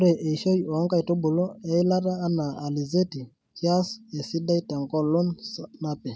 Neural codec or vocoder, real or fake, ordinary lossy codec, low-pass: none; real; none; none